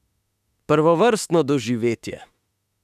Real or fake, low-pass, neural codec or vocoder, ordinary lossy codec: fake; 14.4 kHz; autoencoder, 48 kHz, 32 numbers a frame, DAC-VAE, trained on Japanese speech; none